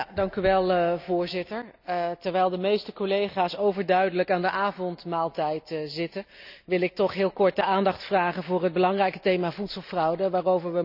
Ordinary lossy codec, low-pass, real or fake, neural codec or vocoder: none; 5.4 kHz; real; none